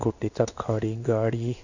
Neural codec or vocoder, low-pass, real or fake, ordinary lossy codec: codec, 16 kHz in and 24 kHz out, 1 kbps, XY-Tokenizer; 7.2 kHz; fake; none